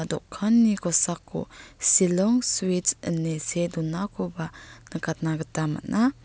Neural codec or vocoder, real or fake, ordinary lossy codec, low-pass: none; real; none; none